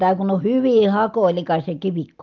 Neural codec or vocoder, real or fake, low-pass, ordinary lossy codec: none; real; 7.2 kHz; Opus, 32 kbps